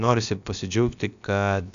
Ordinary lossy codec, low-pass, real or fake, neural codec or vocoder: AAC, 96 kbps; 7.2 kHz; fake; codec, 16 kHz, about 1 kbps, DyCAST, with the encoder's durations